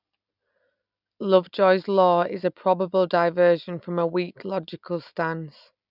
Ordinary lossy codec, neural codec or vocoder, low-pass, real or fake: none; none; 5.4 kHz; real